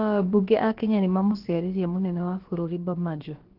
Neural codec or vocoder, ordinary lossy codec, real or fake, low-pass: codec, 16 kHz, about 1 kbps, DyCAST, with the encoder's durations; Opus, 16 kbps; fake; 5.4 kHz